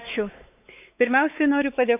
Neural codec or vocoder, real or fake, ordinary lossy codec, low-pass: codec, 16 kHz, 4 kbps, X-Codec, WavLM features, trained on Multilingual LibriSpeech; fake; none; 3.6 kHz